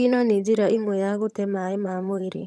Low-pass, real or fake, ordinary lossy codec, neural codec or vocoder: none; fake; none; vocoder, 22.05 kHz, 80 mel bands, HiFi-GAN